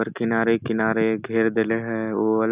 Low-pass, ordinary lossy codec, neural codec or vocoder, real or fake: 3.6 kHz; Opus, 64 kbps; none; real